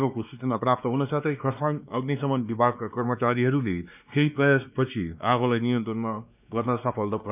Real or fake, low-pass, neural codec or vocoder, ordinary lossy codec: fake; 3.6 kHz; codec, 16 kHz, 2 kbps, X-Codec, WavLM features, trained on Multilingual LibriSpeech; none